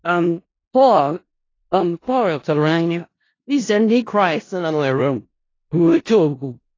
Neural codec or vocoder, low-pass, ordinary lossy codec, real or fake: codec, 16 kHz in and 24 kHz out, 0.4 kbps, LongCat-Audio-Codec, four codebook decoder; 7.2 kHz; AAC, 32 kbps; fake